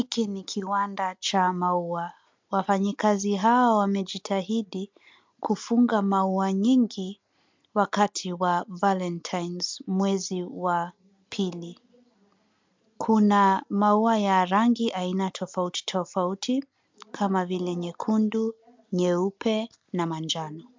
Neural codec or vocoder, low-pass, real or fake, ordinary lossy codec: none; 7.2 kHz; real; MP3, 64 kbps